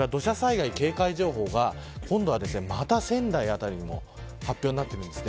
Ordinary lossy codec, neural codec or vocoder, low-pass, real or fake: none; none; none; real